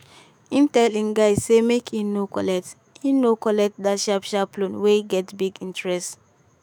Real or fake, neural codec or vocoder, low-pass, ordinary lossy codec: fake; autoencoder, 48 kHz, 128 numbers a frame, DAC-VAE, trained on Japanese speech; none; none